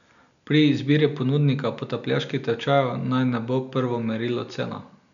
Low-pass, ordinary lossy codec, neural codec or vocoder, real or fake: 7.2 kHz; none; none; real